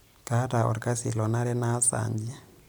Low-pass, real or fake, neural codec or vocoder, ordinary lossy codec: none; real; none; none